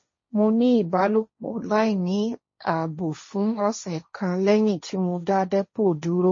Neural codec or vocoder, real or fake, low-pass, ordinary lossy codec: codec, 16 kHz, 1.1 kbps, Voila-Tokenizer; fake; 7.2 kHz; MP3, 32 kbps